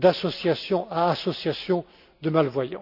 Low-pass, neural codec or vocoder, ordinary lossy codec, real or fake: 5.4 kHz; none; none; real